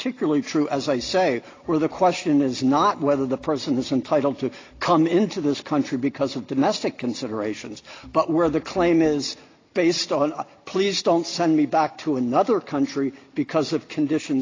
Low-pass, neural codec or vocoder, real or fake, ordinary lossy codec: 7.2 kHz; none; real; AAC, 32 kbps